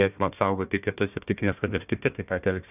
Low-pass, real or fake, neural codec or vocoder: 3.6 kHz; fake; codec, 16 kHz, 1 kbps, FunCodec, trained on Chinese and English, 50 frames a second